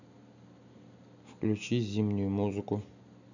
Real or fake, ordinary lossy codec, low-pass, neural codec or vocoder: real; none; 7.2 kHz; none